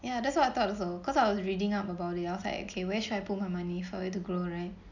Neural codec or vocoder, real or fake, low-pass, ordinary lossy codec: none; real; 7.2 kHz; none